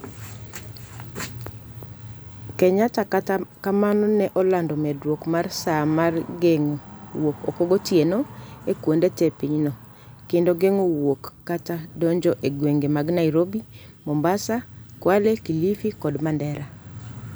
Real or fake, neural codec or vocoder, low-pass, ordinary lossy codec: real; none; none; none